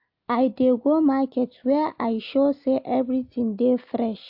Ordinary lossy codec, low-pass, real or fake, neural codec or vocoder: AAC, 48 kbps; 5.4 kHz; real; none